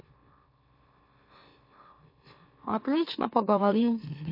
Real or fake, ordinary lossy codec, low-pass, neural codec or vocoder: fake; MP3, 32 kbps; 5.4 kHz; autoencoder, 44.1 kHz, a latent of 192 numbers a frame, MeloTTS